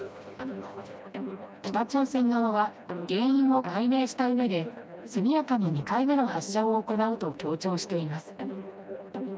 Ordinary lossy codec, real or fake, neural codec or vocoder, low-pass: none; fake; codec, 16 kHz, 1 kbps, FreqCodec, smaller model; none